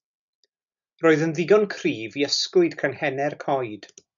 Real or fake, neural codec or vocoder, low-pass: real; none; 7.2 kHz